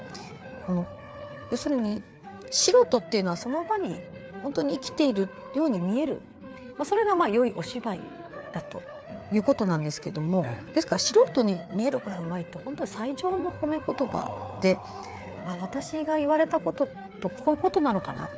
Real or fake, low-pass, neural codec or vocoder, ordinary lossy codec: fake; none; codec, 16 kHz, 4 kbps, FreqCodec, larger model; none